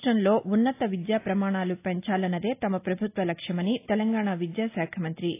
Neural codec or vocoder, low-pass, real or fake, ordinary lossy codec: none; 3.6 kHz; real; AAC, 24 kbps